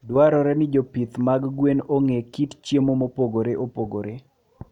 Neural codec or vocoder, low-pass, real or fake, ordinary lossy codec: none; 19.8 kHz; real; none